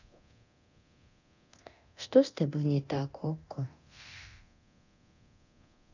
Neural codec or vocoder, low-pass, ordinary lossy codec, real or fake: codec, 24 kHz, 0.9 kbps, DualCodec; 7.2 kHz; none; fake